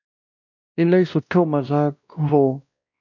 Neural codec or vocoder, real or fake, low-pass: codec, 16 kHz, 1 kbps, X-Codec, WavLM features, trained on Multilingual LibriSpeech; fake; 7.2 kHz